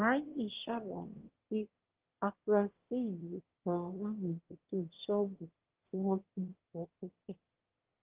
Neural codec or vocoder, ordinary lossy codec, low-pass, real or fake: autoencoder, 22.05 kHz, a latent of 192 numbers a frame, VITS, trained on one speaker; Opus, 16 kbps; 3.6 kHz; fake